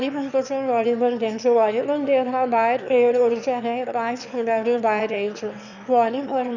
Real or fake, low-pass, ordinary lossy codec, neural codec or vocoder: fake; 7.2 kHz; Opus, 64 kbps; autoencoder, 22.05 kHz, a latent of 192 numbers a frame, VITS, trained on one speaker